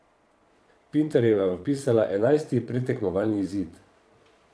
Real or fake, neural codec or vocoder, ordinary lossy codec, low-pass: fake; vocoder, 22.05 kHz, 80 mel bands, Vocos; none; none